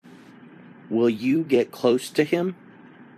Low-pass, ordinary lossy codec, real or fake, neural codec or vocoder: 14.4 kHz; MP3, 96 kbps; fake; vocoder, 44.1 kHz, 128 mel bands every 256 samples, BigVGAN v2